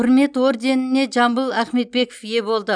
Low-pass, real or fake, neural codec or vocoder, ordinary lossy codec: 9.9 kHz; real; none; none